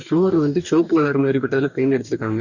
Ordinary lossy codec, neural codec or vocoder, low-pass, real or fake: none; codec, 44.1 kHz, 2.6 kbps, DAC; 7.2 kHz; fake